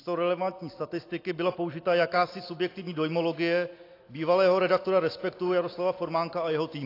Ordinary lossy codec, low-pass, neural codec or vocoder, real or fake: AAC, 32 kbps; 5.4 kHz; none; real